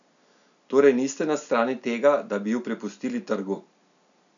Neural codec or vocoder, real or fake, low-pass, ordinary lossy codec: none; real; 7.2 kHz; none